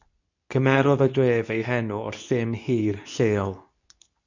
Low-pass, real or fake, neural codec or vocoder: 7.2 kHz; fake; codec, 24 kHz, 0.9 kbps, WavTokenizer, medium speech release version 2